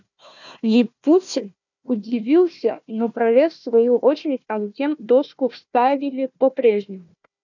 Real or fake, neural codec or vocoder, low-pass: fake; codec, 16 kHz, 1 kbps, FunCodec, trained on Chinese and English, 50 frames a second; 7.2 kHz